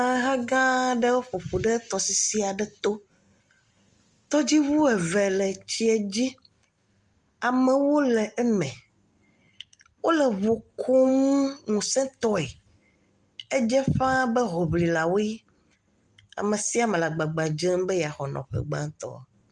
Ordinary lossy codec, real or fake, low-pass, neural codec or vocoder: Opus, 32 kbps; real; 10.8 kHz; none